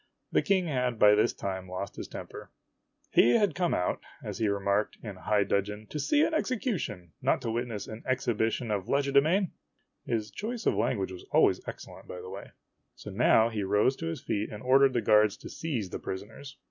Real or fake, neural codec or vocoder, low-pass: real; none; 7.2 kHz